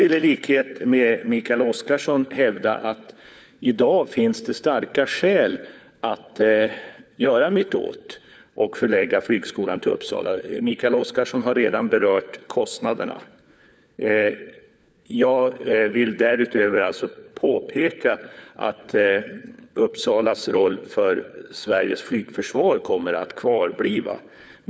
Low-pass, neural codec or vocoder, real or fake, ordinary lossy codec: none; codec, 16 kHz, 4 kbps, FunCodec, trained on LibriTTS, 50 frames a second; fake; none